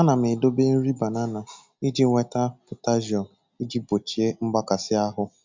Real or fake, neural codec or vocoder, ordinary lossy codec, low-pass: real; none; MP3, 64 kbps; 7.2 kHz